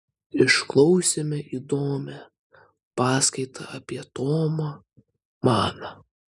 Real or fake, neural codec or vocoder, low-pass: real; none; 10.8 kHz